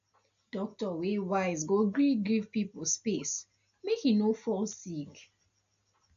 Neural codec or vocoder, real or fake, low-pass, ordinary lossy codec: none; real; 7.2 kHz; AAC, 64 kbps